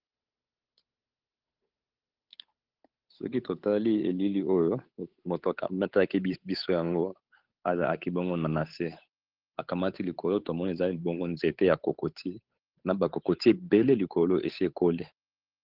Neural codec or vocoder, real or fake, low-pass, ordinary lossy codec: codec, 16 kHz, 8 kbps, FunCodec, trained on Chinese and English, 25 frames a second; fake; 5.4 kHz; Opus, 32 kbps